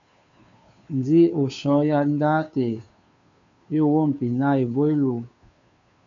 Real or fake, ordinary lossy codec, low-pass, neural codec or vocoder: fake; AAC, 64 kbps; 7.2 kHz; codec, 16 kHz, 2 kbps, FunCodec, trained on Chinese and English, 25 frames a second